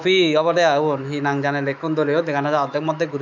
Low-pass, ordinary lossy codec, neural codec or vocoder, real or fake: 7.2 kHz; none; autoencoder, 48 kHz, 128 numbers a frame, DAC-VAE, trained on Japanese speech; fake